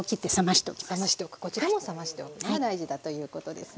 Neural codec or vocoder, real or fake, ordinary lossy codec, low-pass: none; real; none; none